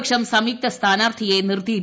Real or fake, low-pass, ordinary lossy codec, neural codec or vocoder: real; none; none; none